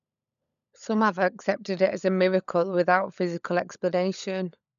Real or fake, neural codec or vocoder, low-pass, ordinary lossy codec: fake; codec, 16 kHz, 16 kbps, FunCodec, trained on LibriTTS, 50 frames a second; 7.2 kHz; none